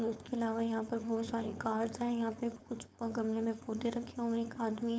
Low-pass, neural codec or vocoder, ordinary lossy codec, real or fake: none; codec, 16 kHz, 4.8 kbps, FACodec; none; fake